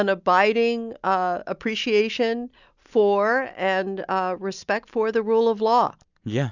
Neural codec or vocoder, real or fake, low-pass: none; real; 7.2 kHz